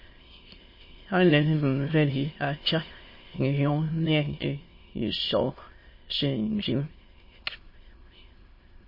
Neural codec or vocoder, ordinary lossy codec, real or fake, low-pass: autoencoder, 22.05 kHz, a latent of 192 numbers a frame, VITS, trained on many speakers; MP3, 24 kbps; fake; 5.4 kHz